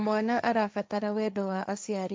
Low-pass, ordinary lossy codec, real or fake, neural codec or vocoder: none; none; fake; codec, 16 kHz, 1.1 kbps, Voila-Tokenizer